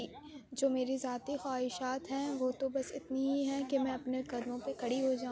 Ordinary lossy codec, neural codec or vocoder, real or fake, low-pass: none; none; real; none